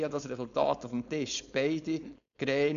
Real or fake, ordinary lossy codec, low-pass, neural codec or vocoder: fake; none; 7.2 kHz; codec, 16 kHz, 4.8 kbps, FACodec